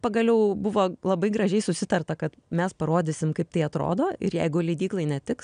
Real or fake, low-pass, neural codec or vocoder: real; 9.9 kHz; none